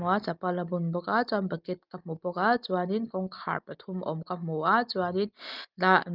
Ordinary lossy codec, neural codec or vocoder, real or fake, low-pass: Opus, 32 kbps; none; real; 5.4 kHz